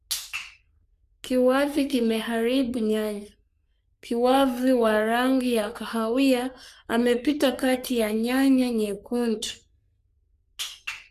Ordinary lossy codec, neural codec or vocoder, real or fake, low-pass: none; codec, 44.1 kHz, 3.4 kbps, Pupu-Codec; fake; 14.4 kHz